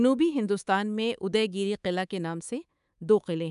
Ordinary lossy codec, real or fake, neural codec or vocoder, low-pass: none; fake; codec, 24 kHz, 3.1 kbps, DualCodec; 10.8 kHz